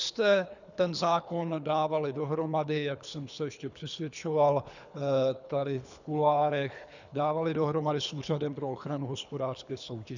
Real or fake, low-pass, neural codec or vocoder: fake; 7.2 kHz; codec, 24 kHz, 3 kbps, HILCodec